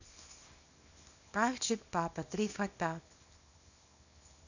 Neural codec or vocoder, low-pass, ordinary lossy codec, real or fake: codec, 24 kHz, 0.9 kbps, WavTokenizer, small release; 7.2 kHz; none; fake